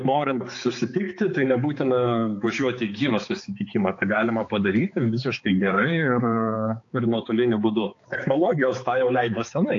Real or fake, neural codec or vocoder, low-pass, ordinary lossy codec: fake; codec, 16 kHz, 4 kbps, X-Codec, HuBERT features, trained on balanced general audio; 7.2 kHz; AAC, 48 kbps